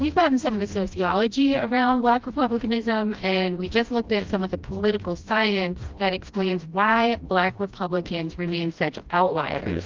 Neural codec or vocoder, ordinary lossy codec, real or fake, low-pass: codec, 16 kHz, 1 kbps, FreqCodec, smaller model; Opus, 32 kbps; fake; 7.2 kHz